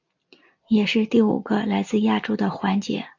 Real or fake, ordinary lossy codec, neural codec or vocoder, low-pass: real; AAC, 48 kbps; none; 7.2 kHz